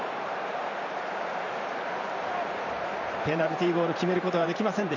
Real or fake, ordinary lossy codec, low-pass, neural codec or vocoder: fake; none; 7.2 kHz; vocoder, 44.1 kHz, 128 mel bands every 512 samples, BigVGAN v2